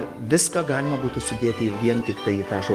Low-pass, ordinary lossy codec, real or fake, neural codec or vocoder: 14.4 kHz; Opus, 24 kbps; fake; codec, 44.1 kHz, 7.8 kbps, DAC